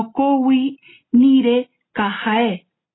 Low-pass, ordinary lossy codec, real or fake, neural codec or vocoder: 7.2 kHz; AAC, 16 kbps; real; none